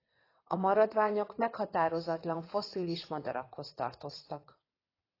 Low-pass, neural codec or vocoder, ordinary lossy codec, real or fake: 5.4 kHz; vocoder, 22.05 kHz, 80 mel bands, WaveNeXt; AAC, 24 kbps; fake